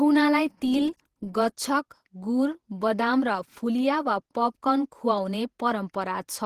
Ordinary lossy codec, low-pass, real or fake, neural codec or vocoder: Opus, 16 kbps; 14.4 kHz; fake; vocoder, 48 kHz, 128 mel bands, Vocos